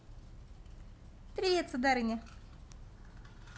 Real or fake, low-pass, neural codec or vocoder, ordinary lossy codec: real; none; none; none